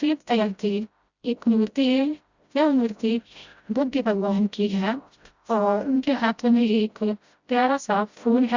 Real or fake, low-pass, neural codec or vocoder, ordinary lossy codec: fake; 7.2 kHz; codec, 16 kHz, 0.5 kbps, FreqCodec, smaller model; Opus, 64 kbps